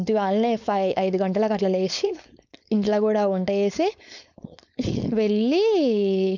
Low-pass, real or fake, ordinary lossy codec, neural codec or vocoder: 7.2 kHz; fake; none; codec, 16 kHz, 4.8 kbps, FACodec